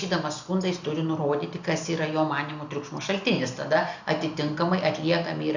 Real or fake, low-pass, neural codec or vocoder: real; 7.2 kHz; none